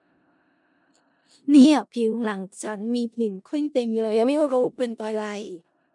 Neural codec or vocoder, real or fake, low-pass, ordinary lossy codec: codec, 16 kHz in and 24 kHz out, 0.4 kbps, LongCat-Audio-Codec, four codebook decoder; fake; 10.8 kHz; MP3, 64 kbps